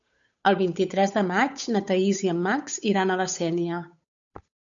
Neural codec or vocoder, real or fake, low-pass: codec, 16 kHz, 8 kbps, FunCodec, trained on Chinese and English, 25 frames a second; fake; 7.2 kHz